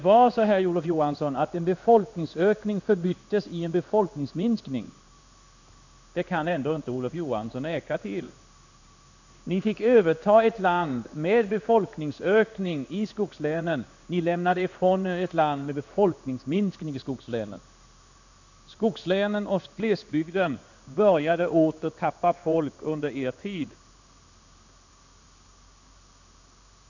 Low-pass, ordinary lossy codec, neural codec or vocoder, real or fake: 7.2 kHz; none; codec, 16 kHz in and 24 kHz out, 1 kbps, XY-Tokenizer; fake